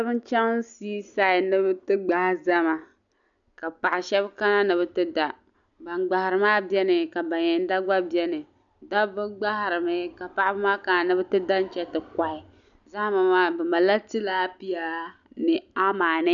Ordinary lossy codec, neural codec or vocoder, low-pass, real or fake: MP3, 96 kbps; none; 7.2 kHz; real